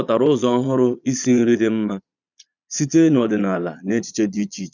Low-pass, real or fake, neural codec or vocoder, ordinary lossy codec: 7.2 kHz; fake; vocoder, 22.05 kHz, 80 mel bands, Vocos; none